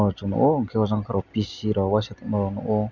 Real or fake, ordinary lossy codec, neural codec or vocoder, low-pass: real; none; none; 7.2 kHz